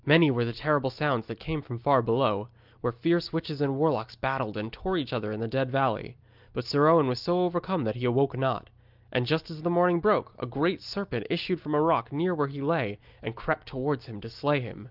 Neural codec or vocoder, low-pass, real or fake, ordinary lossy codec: none; 5.4 kHz; real; Opus, 24 kbps